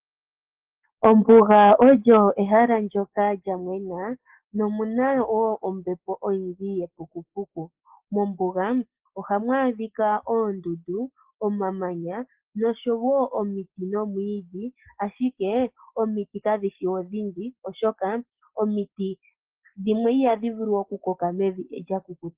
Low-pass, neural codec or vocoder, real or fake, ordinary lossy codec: 3.6 kHz; none; real; Opus, 16 kbps